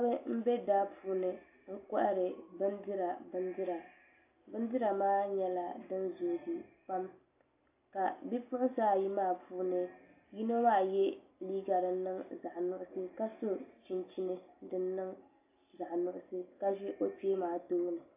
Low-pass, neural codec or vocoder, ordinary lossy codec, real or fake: 3.6 kHz; none; AAC, 24 kbps; real